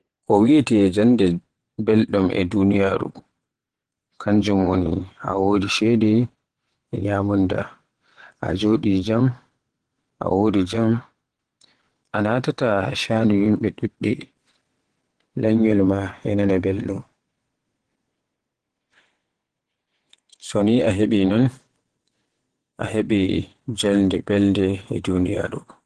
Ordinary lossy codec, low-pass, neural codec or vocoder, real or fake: Opus, 16 kbps; 9.9 kHz; vocoder, 22.05 kHz, 80 mel bands, WaveNeXt; fake